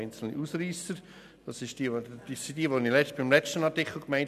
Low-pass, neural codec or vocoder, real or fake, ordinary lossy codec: 14.4 kHz; none; real; none